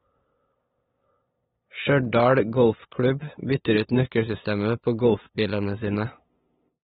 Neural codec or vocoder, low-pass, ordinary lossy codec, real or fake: codec, 16 kHz, 8 kbps, FunCodec, trained on LibriTTS, 25 frames a second; 7.2 kHz; AAC, 16 kbps; fake